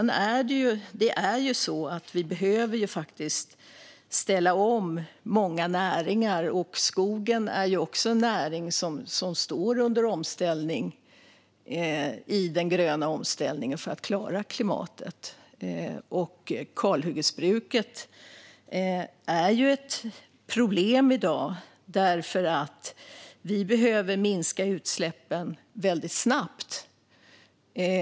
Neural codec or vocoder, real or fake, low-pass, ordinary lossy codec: none; real; none; none